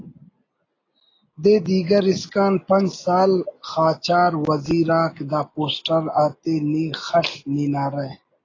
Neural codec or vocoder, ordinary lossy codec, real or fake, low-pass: none; AAC, 32 kbps; real; 7.2 kHz